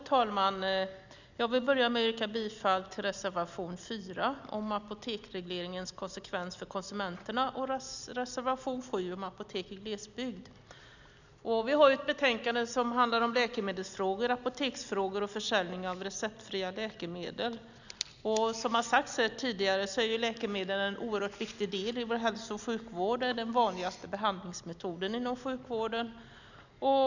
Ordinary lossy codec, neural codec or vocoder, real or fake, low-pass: none; none; real; 7.2 kHz